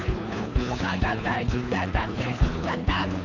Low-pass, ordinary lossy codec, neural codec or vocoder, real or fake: 7.2 kHz; none; codec, 24 kHz, 3 kbps, HILCodec; fake